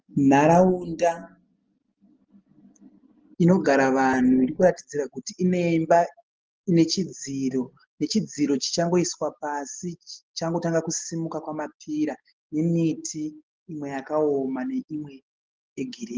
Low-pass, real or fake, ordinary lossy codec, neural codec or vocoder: 7.2 kHz; real; Opus, 16 kbps; none